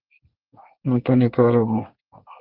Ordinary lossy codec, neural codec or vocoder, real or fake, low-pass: Opus, 32 kbps; codec, 24 kHz, 1 kbps, SNAC; fake; 5.4 kHz